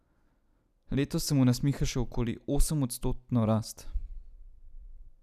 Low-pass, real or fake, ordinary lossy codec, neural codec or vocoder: 14.4 kHz; real; none; none